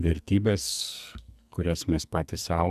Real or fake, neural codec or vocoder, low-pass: fake; codec, 44.1 kHz, 2.6 kbps, SNAC; 14.4 kHz